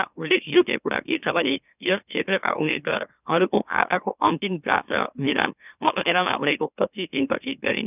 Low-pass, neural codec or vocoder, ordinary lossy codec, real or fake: 3.6 kHz; autoencoder, 44.1 kHz, a latent of 192 numbers a frame, MeloTTS; none; fake